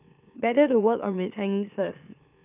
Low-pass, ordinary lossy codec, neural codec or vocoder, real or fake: 3.6 kHz; none; autoencoder, 44.1 kHz, a latent of 192 numbers a frame, MeloTTS; fake